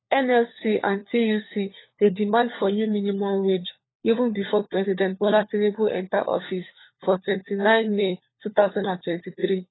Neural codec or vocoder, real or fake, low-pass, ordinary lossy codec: codec, 16 kHz, 4 kbps, FunCodec, trained on LibriTTS, 50 frames a second; fake; 7.2 kHz; AAC, 16 kbps